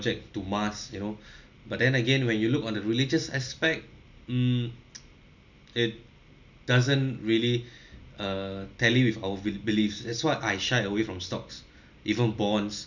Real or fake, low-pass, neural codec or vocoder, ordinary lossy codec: real; 7.2 kHz; none; none